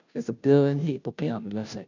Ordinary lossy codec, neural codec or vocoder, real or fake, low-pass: none; codec, 16 kHz, 0.5 kbps, FunCodec, trained on Chinese and English, 25 frames a second; fake; 7.2 kHz